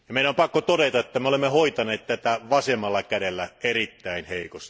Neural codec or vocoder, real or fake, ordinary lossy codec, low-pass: none; real; none; none